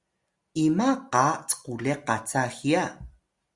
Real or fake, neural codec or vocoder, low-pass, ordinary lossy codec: real; none; 10.8 kHz; Opus, 64 kbps